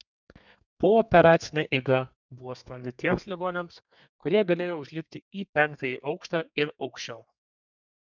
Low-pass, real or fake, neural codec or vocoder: 7.2 kHz; fake; codec, 32 kHz, 1.9 kbps, SNAC